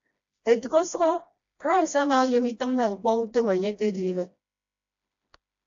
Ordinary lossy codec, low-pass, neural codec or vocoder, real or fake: AAC, 64 kbps; 7.2 kHz; codec, 16 kHz, 1 kbps, FreqCodec, smaller model; fake